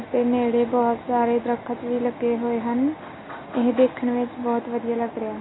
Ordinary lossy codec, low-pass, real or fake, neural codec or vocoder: AAC, 16 kbps; 7.2 kHz; real; none